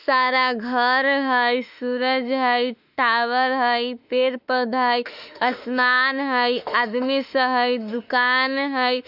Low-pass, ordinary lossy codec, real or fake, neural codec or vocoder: 5.4 kHz; none; fake; autoencoder, 48 kHz, 32 numbers a frame, DAC-VAE, trained on Japanese speech